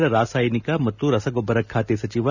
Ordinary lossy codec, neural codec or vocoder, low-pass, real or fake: none; none; 7.2 kHz; real